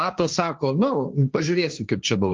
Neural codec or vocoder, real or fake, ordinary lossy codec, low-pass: codec, 16 kHz, 1.1 kbps, Voila-Tokenizer; fake; Opus, 24 kbps; 7.2 kHz